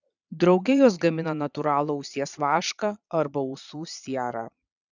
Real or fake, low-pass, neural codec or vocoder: fake; 7.2 kHz; vocoder, 22.05 kHz, 80 mel bands, Vocos